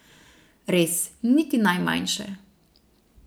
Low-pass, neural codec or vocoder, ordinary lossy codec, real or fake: none; none; none; real